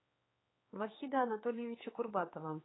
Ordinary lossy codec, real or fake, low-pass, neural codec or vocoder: AAC, 16 kbps; fake; 7.2 kHz; codec, 16 kHz, 4 kbps, X-Codec, HuBERT features, trained on general audio